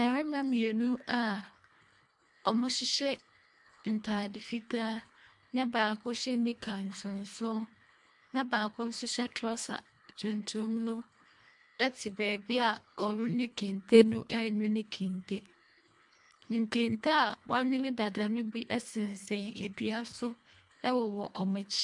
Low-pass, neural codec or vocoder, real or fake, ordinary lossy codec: 10.8 kHz; codec, 24 kHz, 1.5 kbps, HILCodec; fake; MP3, 64 kbps